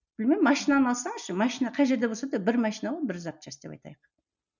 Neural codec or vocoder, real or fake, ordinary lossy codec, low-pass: none; real; none; 7.2 kHz